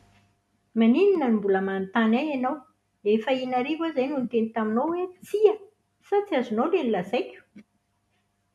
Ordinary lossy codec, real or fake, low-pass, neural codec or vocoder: none; real; none; none